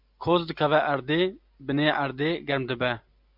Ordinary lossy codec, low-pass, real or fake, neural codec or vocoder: MP3, 48 kbps; 5.4 kHz; real; none